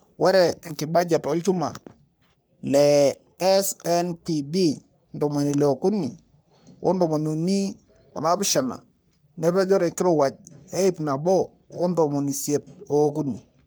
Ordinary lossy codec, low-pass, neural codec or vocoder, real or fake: none; none; codec, 44.1 kHz, 3.4 kbps, Pupu-Codec; fake